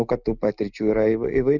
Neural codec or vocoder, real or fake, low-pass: none; real; 7.2 kHz